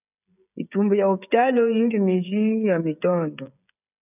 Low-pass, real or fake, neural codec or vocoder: 3.6 kHz; fake; codec, 16 kHz, 16 kbps, FreqCodec, smaller model